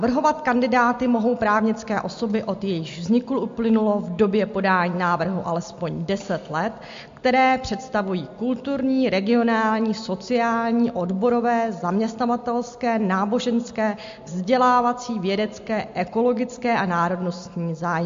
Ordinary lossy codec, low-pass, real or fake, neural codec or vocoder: MP3, 48 kbps; 7.2 kHz; real; none